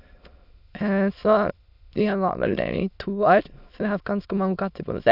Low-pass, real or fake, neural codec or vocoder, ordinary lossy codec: 5.4 kHz; fake; autoencoder, 22.05 kHz, a latent of 192 numbers a frame, VITS, trained on many speakers; none